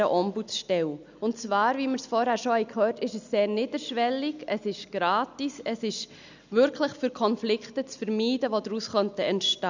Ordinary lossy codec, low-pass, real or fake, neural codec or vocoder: none; 7.2 kHz; real; none